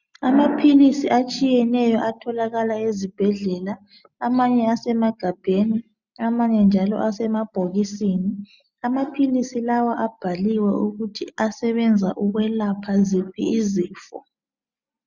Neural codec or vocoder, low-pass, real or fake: none; 7.2 kHz; real